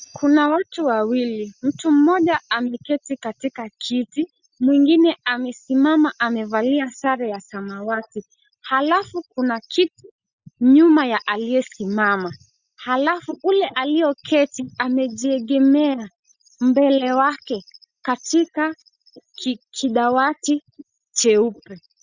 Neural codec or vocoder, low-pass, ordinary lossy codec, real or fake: none; 7.2 kHz; Opus, 64 kbps; real